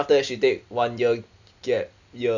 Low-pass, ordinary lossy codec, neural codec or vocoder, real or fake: 7.2 kHz; none; none; real